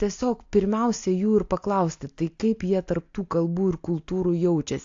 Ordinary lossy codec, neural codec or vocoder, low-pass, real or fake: AAC, 48 kbps; none; 7.2 kHz; real